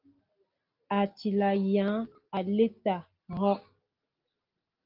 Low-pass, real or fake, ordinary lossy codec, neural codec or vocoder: 5.4 kHz; real; Opus, 32 kbps; none